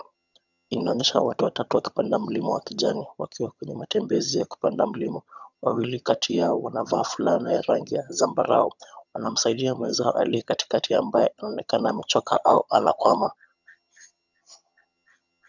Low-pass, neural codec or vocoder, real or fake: 7.2 kHz; vocoder, 22.05 kHz, 80 mel bands, HiFi-GAN; fake